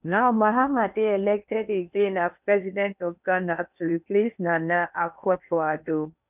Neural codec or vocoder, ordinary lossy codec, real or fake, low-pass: codec, 16 kHz in and 24 kHz out, 0.8 kbps, FocalCodec, streaming, 65536 codes; none; fake; 3.6 kHz